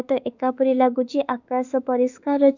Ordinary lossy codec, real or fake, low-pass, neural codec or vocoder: none; fake; 7.2 kHz; autoencoder, 48 kHz, 32 numbers a frame, DAC-VAE, trained on Japanese speech